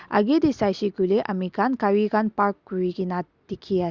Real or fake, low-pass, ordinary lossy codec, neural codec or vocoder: real; 7.2 kHz; Opus, 64 kbps; none